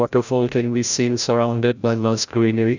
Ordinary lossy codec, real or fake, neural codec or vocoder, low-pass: none; fake; codec, 16 kHz, 0.5 kbps, FreqCodec, larger model; 7.2 kHz